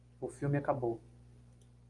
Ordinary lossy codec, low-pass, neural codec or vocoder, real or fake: Opus, 32 kbps; 10.8 kHz; none; real